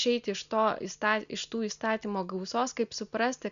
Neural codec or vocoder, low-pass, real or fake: none; 7.2 kHz; real